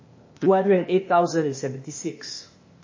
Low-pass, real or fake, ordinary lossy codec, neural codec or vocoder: 7.2 kHz; fake; MP3, 32 kbps; codec, 16 kHz, 0.8 kbps, ZipCodec